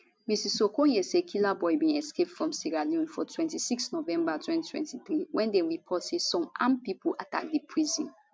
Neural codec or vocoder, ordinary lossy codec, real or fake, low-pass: none; none; real; none